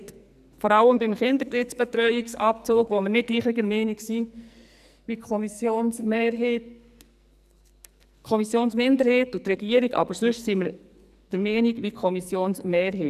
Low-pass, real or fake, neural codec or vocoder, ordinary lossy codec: 14.4 kHz; fake; codec, 44.1 kHz, 2.6 kbps, SNAC; none